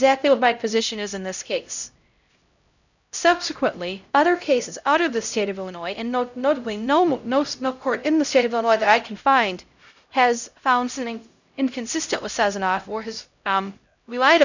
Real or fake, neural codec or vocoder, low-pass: fake; codec, 16 kHz, 0.5 kbps, X-Codec, HuBERT features, trained on LibriSpeech; 7.2 kHz